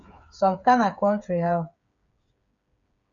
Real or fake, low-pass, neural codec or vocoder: fake; 7.2 kHz; codec, 16 kHz, 8 kbps, FreqCodec, smaller model